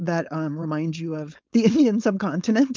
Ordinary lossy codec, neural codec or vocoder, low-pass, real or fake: Opus, 24 kbps; codec, 16 kHz, 4.8 kbps, FACodec; 7.2 kHz; fake